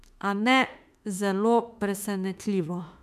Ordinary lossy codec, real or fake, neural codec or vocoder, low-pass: MP3, 96 kbps; fake; autoencoder, 48 kHz, 32 numbers a frame, DAC-VAE, trained on Japanese speech; 14.4 kHz